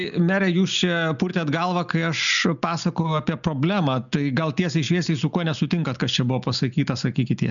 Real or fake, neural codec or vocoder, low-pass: real; none; 7.2 kHz